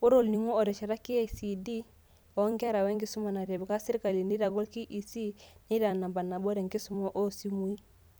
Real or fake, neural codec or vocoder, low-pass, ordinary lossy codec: fake; vocoder, 44.1 kHz, 128 mel bands every 256 samples, BigVGAN v2; none; none